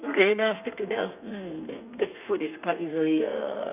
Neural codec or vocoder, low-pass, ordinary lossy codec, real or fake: codec, 32 kHz, 1.9 kbps, SNAC; 3.6 kHz; none; fake